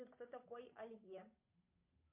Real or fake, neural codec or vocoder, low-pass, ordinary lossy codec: fake; codec, 16 kHz, 8 kbps, FunCodec, trained on Chinese and English, 25 frames a second; 3.6 kHz; MP3, 32 kbps